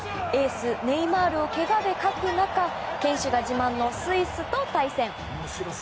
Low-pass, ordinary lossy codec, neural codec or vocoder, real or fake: none; none; none; real